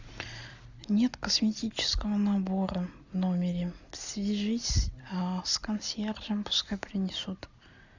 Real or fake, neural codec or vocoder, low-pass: real; none; 7.2 kHz